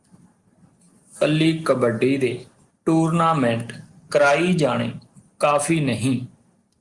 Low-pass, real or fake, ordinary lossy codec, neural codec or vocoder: 10.8 kHz; real; Opus, 24 kbps; none